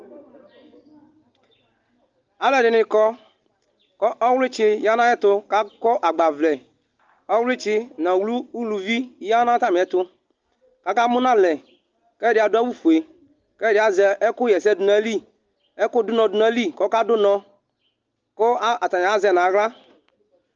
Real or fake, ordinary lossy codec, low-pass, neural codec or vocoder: real; Opus, 24 kbps; 7.2 kHz; none